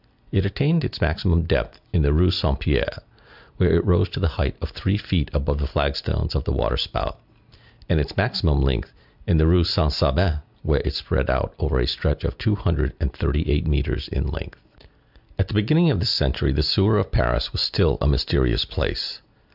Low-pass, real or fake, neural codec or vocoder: 5.4 kHz; real; none